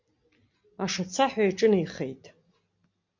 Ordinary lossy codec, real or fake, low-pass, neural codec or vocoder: MP3, 48 kbps; real; 7.2 kHz; none